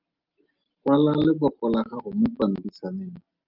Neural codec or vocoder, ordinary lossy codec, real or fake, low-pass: none; Opus, 32 kbps; real; 5.4 kHz